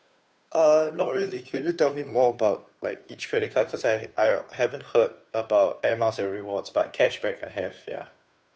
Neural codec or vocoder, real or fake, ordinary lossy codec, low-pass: codec, 16 kHz, 2 kbps, FunCodec, trained on Chinese and English, 25 frames a second; fake; none; none